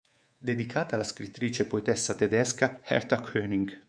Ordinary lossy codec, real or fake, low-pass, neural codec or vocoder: MP3, 96 kbps; fake; 9.9 kHz; codec, 24 kHz, 3.1 kbps, DualCodec